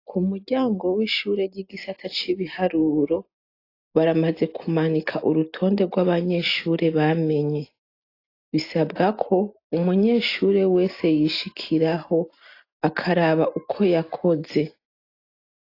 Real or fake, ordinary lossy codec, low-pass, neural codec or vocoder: real; AAC, 32 kbps; 5.4 kHz; none